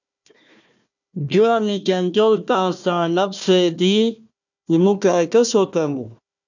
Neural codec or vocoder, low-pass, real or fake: codec, 16 kHz, 1 kbps, FunCodec, trained on Chinese and English, 50 frames a second; 7.2 kHz; fake